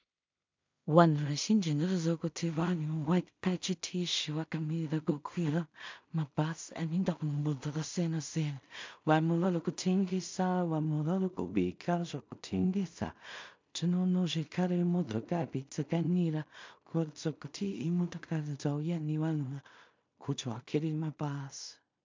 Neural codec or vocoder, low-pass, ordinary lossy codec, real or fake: codec, 16 kHz in and 24 kHz out, 0.4 kbps, LongCat-Audio-Codec, two codebook decoder; 7.2 kHz; MP3, 64 kbps; fake